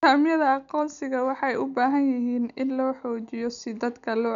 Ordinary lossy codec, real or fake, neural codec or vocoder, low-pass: none; real; none; 7.2 kHz